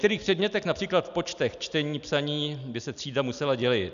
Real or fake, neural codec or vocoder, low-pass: real; none; 7.2 kHz